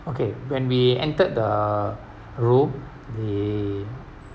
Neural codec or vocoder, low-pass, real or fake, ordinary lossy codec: none; none; real; none